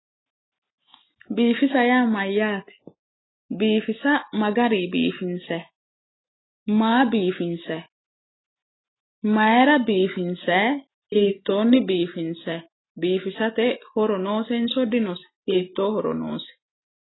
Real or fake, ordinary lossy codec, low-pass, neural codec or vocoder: real; AAC, 16 kbps; 7.2 kHz; none